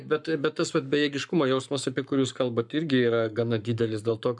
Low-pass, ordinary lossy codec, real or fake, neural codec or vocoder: 10.8 kHz; MP3, 96 kbps; fake; codec, 44.1 kHz, 7.8 kbps, Pupu-Codec